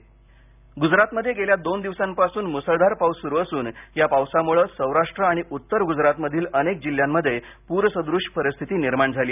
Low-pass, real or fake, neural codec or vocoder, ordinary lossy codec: 3.6 kHz; real; none; none